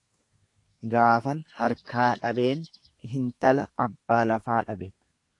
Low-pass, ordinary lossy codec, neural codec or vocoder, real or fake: 10.8 kHz; AAC, 48 kbps; codec, 24 kHz, 1 kbps, SNAC; fake